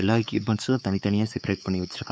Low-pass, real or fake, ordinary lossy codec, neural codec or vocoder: none; fake; none; codec, 16 kHz, 4 kbps, X-Codec, WavLM features, trained on Multilingual LibriSpeech